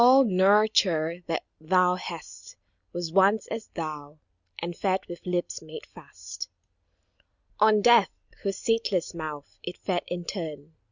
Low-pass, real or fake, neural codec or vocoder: 7.2 kHz; real; none